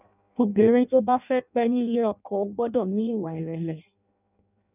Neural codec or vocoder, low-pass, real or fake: codec, 16 kHz in and 24 kHz out, 0.6 kbps, FireRedTTS-2 codec; 3.6 kHz; fake